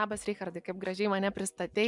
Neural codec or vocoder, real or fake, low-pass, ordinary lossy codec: none; real; 10.8 kHz; MP3, 96 kbps